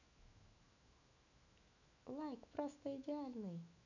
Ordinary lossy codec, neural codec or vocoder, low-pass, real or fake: none; autoencoder, 48 kHz, 128 numbers a frame, DAC-VAE, trained on Japanese speech; 7.2 kHz; fake